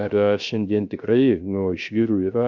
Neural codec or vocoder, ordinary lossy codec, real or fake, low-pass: codec, 16 kHz, 0.3 kbps, FocalCodec; Opus, 64 kbps; fake; 7.2 kHz